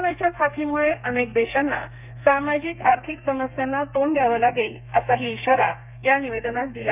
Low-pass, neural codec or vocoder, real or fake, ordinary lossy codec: 3.6 kHz; codec, 32 kHz, 1.9 kbps, SNAC; fake; none